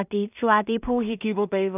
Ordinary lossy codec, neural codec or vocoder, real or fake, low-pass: none; codec, 16 kHz in and 24 kHz out, 0.4 kbps, LongCat-Audio-Codec, two codebook decoder; fake; 3.6 kHz